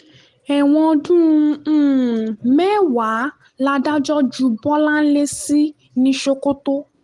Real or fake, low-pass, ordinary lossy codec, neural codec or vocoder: real; 10.8 kHz; Opus, 24 kbps; none